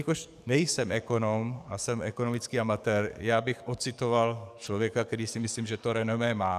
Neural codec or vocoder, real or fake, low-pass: codec, 44.1 kHz, 7.8 kbps, DAC; fake; 14.4 kHz